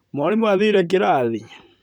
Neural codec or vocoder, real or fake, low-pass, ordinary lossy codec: vocoder, 44.1 kHz, 128 mel bands, Pupu-Vocoder; fake; 19.8 kHz; none